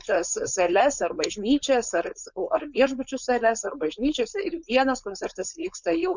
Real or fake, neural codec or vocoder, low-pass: fake; codec, 16 kHz, 4.8 kbps, FACodec; 7.2 kHz